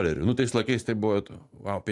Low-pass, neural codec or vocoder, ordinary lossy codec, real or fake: 10.8 kHz; none; AAC, 64 kbps; real